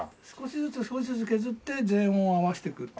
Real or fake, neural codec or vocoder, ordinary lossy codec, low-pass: real; none; none; none